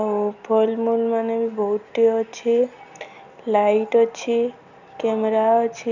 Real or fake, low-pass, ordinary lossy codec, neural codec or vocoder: real; 7.2 kHz; none; none